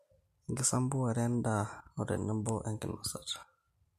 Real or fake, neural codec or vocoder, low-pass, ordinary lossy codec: real; none; 14.4 kHz; MP3, 64 kbps